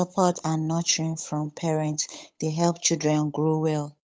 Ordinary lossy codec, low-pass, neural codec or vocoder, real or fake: none; none; codec, 16 kHz, 8 kbps, FunCodec, trained on Chinese and English, 25 frames a second; fake